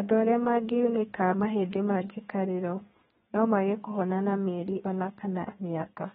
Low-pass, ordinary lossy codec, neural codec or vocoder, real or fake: 14.4 kHz; AAC, 16 kbps; codec, 32 kHz, 1.9 kbps, SNAC; fake